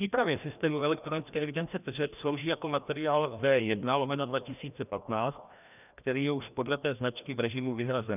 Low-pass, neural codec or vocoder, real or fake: 3.6 kHz; codec, 16 kHz, 1 kbps, FreqCodec, larger model; fake